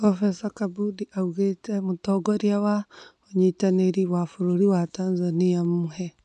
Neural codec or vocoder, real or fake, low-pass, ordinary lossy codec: none; real; 10.8 kHz; none